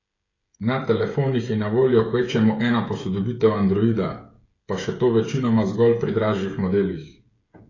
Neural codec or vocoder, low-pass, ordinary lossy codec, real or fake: codec, 16 kHz, 16 kbps, FreqCodec, smaller model; 7.2 kHz; AAC, 32 kbps; fake